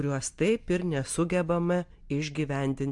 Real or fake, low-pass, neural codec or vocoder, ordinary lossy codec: real; 10.8 kHz; none; MP3, 64 kbps